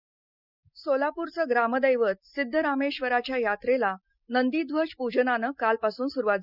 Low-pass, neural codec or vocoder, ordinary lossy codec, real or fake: 5.4 kHz; none; none; real